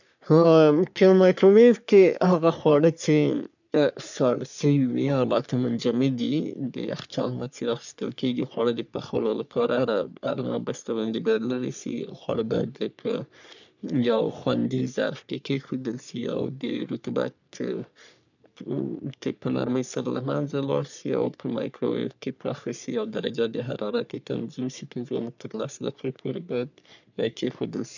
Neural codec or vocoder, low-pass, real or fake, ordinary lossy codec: codec, 44.1 kHz, 3.4 kbps, Pupu-Codec; 7.2 kHz; fake; none